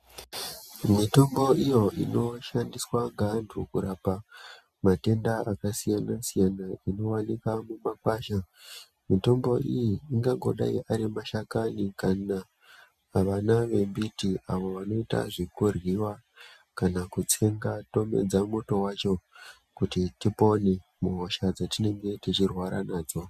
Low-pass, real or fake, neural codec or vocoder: 14.4 kHz; real; none